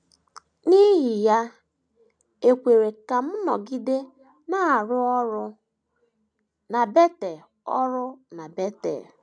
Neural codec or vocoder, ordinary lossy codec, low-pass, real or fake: none; none; 9.9 kHz; real